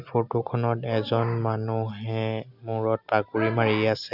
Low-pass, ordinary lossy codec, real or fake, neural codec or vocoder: 5.4 kHz; none; real; none